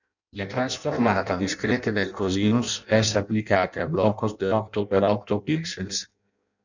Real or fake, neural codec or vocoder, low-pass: fake; codec, 16 kHz in and 24 kHz out, 0.6 kbps, FireRedTTS-2 codec; 7.2 kHz